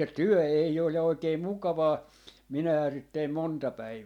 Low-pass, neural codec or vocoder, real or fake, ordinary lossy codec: 19.8 kHz; none; real; MP3, 96 kbps